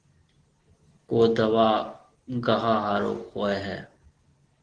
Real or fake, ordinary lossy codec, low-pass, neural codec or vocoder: real; Opus, 16 kbps; 9.9 kHz; none